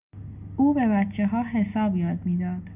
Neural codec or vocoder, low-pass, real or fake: none; 3.6 kHz; real